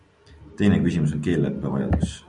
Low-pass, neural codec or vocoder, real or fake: 10.8 kHz; none; real